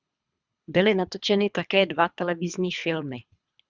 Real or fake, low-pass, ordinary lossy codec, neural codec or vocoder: fake; 7.2 kHz; Opus, 64 kbps; codec, 24 kHz, 3 kbps, HILCodec